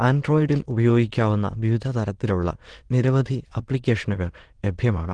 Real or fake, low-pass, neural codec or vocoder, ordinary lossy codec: fake; 9.9 kHz; autoencoder, 22.05 kHz, a latent of 192 numbers a frame, VITS, trained on many speakers; Opus, 16 kbps